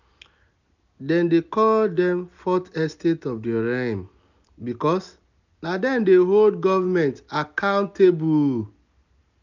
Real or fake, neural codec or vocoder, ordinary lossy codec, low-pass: real; none; none; 7.2 kHz